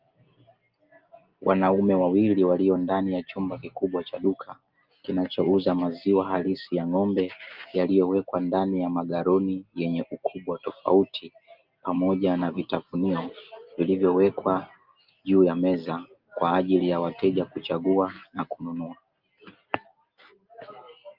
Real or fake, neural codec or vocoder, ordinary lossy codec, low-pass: real; none; Opus, 32 kbps; 5.4 kHz